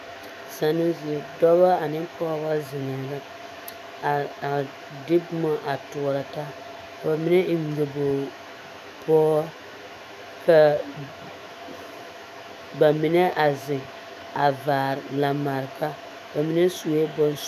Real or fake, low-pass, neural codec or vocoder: fake; 14.4 kHz; autoencoder, 48 kHz, 128 numbers a frame, DAC-VAE, trained on Japanese speech